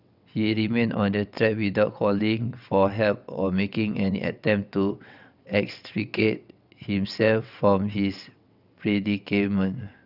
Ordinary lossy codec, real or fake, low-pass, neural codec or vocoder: none; fake; 5.4 kHz; vocoder, 22.05 kHz, 80 mel bands, WaveNeXt